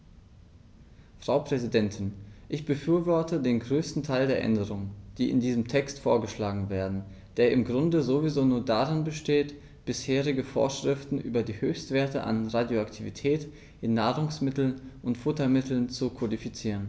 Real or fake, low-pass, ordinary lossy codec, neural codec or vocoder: real; none; none; none